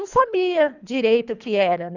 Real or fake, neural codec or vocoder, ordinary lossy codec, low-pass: fake; codec, 24 kHz, 3 kbps, HILCodec; none; 7.2 kHz